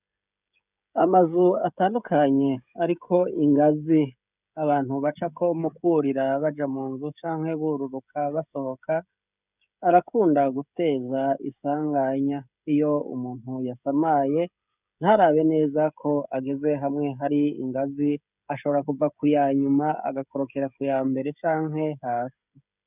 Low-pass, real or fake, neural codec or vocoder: 3.6 kHz; fake; codec, 16 kHz, 16 kbps, FreqCodec, smaller model